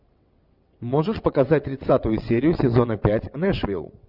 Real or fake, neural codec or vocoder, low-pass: fake; vocoder, 22.05 kHz, 80 mel bands, WaveNeXt; 5.4 kHz